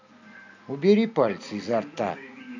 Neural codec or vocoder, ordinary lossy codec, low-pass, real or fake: none; AAC, 48 kbps; 7.2 kHz; real